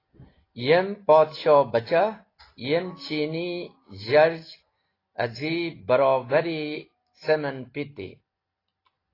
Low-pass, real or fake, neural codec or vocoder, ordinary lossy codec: 5.4 kHz; real; none; AAC, 24 kbps